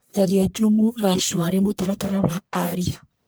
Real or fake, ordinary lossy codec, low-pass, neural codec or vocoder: fake; none; none; codec, 44.1 kHz, 1.7 kbps, Pupu-Codec